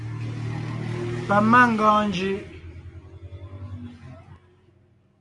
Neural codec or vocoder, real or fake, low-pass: none; real; 10.8 kHz